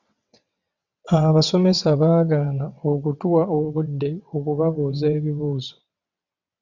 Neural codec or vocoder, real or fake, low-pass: vocoder, 22.05 kHz, 80 mel bands, WaveNeXt; fake; 7.2 kHz